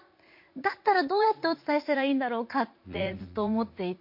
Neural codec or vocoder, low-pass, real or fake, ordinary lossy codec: vocoder, 44.1 kHz, 80 mel bands, Vocos; 5.4 kHz; fake; MP3, 32 kbps